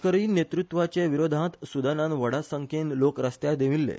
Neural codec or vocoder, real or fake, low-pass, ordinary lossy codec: none; real; none; none